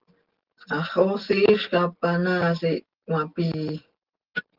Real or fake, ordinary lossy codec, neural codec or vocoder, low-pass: real; Opus, 16 kbps; none; 5.4 kHz